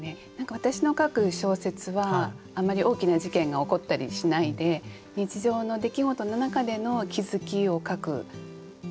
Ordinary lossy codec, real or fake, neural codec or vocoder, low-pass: none; real; none; none